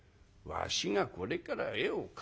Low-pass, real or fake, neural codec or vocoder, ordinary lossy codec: none; real; none; none